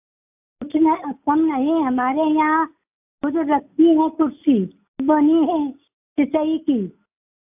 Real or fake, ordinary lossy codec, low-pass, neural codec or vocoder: real; AAC, 32 kbps; 3.6 kHz; none